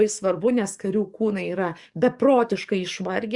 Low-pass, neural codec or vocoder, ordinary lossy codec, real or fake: 10.8 kHz; codec, 44.1 kHz, 7.8 kbps, DAC; Opus, 64 kbps; fake